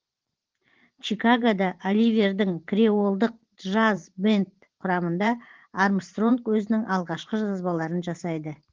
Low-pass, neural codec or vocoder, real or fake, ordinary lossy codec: 7.2 kHz; vocoder, 44.1 kHz, 128 mel bands every 512 samples, BigVGAN v2; fake; Opus, 16 kbps